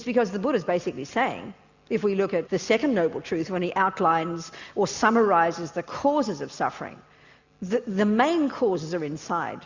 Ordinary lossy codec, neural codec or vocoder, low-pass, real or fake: Opus, 64 kbps; none; 7.2 kHz; real